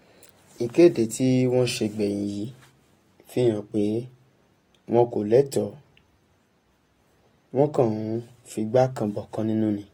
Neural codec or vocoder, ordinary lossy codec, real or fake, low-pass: none; AAC, 48 kbps; real; 19.8 kHz